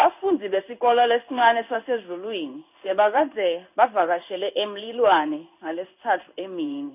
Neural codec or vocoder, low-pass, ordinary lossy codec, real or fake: codec, 16 kHz in and 24 kHz out, 1 kbps, XY-Tokenizer; 3.6 kHz; none; fake